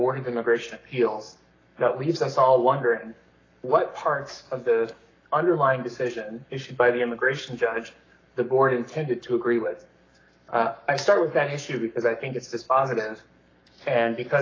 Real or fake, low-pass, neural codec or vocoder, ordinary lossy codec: fake; 7.2 kHz; codec, 44.1 kHz, 7.8 kbps, Pupu-Codec; AAC, 32 kbps